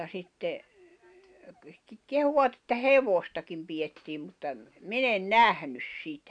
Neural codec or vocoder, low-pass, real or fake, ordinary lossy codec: none; 9.9 kHz; real; none